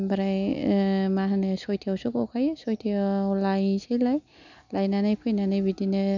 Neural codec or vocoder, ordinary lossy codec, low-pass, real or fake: none; none; 7.2 kHz; real